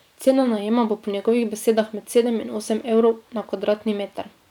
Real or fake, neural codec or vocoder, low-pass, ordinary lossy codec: fake; vocoder, 44.1 kHz, 128 mel bands every 512 samples, BigVGAN v2; 19.8 kHz; none